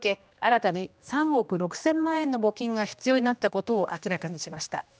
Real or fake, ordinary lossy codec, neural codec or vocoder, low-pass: fake; none; codec, 16 kHz, 1 kbps, X-Codec, HuBERT features, trained on general audio; none